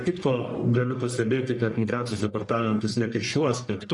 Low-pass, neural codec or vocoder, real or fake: 10.8 kHz; codec, 44.1 kHz, 1.7 kbps, Pupu-Codec; fake